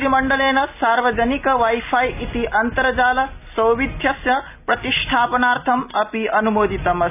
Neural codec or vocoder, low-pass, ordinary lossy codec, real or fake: none; 3.6 kHz; none; real